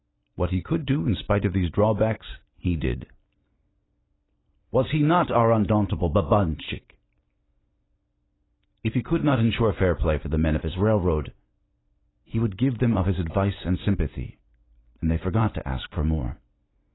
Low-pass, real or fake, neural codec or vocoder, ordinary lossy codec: 7.2 kHz; real; none; AAC, 16 kbps